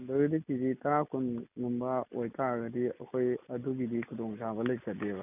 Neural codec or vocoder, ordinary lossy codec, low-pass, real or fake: none; none; 3.6 kHz; real